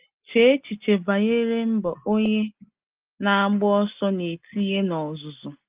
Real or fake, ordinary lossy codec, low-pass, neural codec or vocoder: real; Opus, 24 kbps; 3.6 kHz; none